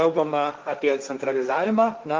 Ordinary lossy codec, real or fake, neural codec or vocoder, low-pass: Opus, 24 kbps; fake; codec, 16 kHz, 1.1 kbps, Voila-Tokenizer; 7.2 kHz